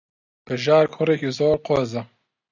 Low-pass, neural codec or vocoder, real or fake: 7.2 kHz; none; real